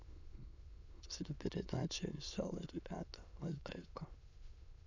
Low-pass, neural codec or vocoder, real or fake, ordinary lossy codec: 7.2 kHz; autoencoder, 22.05 kHz, a latent of 192 numbers a frame, VITS, trained on many speakers; fake; none